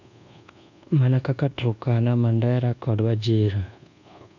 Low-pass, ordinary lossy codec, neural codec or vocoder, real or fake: 7.2 kHz; none; codec, 24 kHz, 1.2 kbps, DualCodec; fake